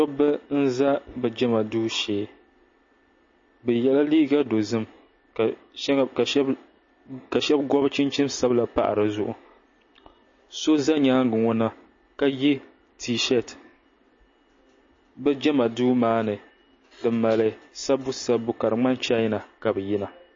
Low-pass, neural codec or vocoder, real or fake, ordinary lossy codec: 7.2 kHz; none; real; MP3, 32 kbps